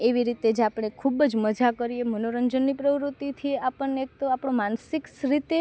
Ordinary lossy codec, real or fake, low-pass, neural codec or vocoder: none; real; none; none